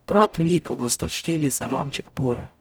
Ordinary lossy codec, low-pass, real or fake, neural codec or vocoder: none; none; fake; codec, 44.1 kHz, 0.9 kbps, DAC